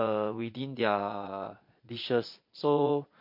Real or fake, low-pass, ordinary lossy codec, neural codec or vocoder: fake; 5.4 kHz; MP3, 32 kbps; vocoder, 22.05 kHz, 80 mel bands, Vocos